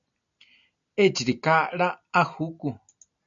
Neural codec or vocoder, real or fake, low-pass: none; real; 7.2 kHz